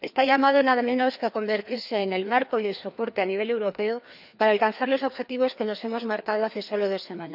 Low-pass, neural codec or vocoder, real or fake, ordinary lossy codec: 5.4 kHz; codec, 16 kHz, 2 kbps, FreqCodec, larger model; fake; none